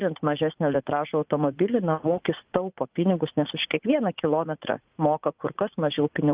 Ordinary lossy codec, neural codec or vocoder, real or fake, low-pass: Opus, 32 kbps; vocoder, 44.1 kHz, 80 mel bands, Vocos; fake; 3.6 kHz